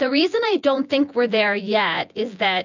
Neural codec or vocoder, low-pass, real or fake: vocoder, 24 kHz, 100 mel bands, Vocos; 7.2 kHz; fake